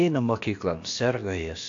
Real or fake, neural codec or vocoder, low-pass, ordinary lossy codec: fake; codec, 16 kHz, about 1 kbps, DyCAST, with the encoder's durations; 7.2 kHz; AAC, 48 kbps